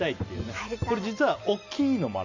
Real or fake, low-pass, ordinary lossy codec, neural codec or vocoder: real; 7.2 kHz; none; none